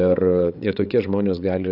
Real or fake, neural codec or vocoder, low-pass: fake; codec, 16 kHz, 8 kbps, FreqCodec, larger model; 5.4 kHz